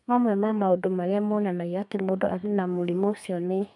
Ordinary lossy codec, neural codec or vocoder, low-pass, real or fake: none; codec, 32 kHz, 1.9 kbps, SNAC; 10.8 kHz; fake